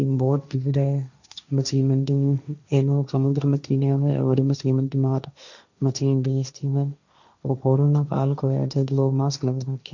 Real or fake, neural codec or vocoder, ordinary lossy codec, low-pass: fake; codec, 16 kHz, 1.1 kbps, Voila-Tokenizer; none; 7.2 kHz